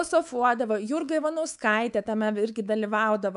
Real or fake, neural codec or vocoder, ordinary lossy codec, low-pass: fake; codec, 24 kHz, 3.1 kbps, DualCodec; MP3, 96 kbps; 10.8 kHz